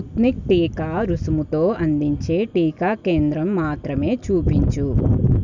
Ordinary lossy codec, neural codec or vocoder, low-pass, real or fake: none; none; 7.2 kHz; real